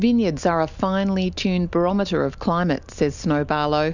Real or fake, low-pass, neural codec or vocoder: real; 7.2 kHz; none